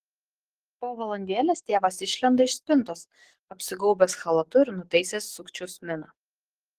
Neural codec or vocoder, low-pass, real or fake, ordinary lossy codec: codec, 44.1 kHz, 7.8 kbps, DAC; 14.4 kHz; fake; Opus, 16 kbps